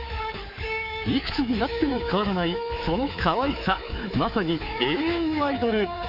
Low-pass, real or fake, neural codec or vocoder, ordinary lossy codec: 5.4 kHz; fake; codec, 24 kHz, 3.1 kbps, DualCodec; none